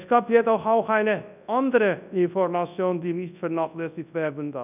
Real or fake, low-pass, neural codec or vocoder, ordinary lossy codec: fake; 3.6 kHz; codec, 24 kHz, 0.9 kbps, WavTokenizer, large speech release; none